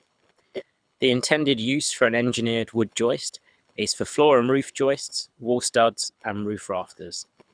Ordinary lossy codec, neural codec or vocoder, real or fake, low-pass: none; codec, 24 kHz, 6 kbps, HILCodec; fake; 9.9 kHz